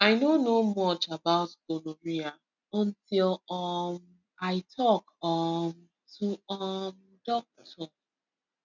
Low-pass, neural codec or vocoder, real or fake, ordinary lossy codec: 7.2 kHz; none; real; none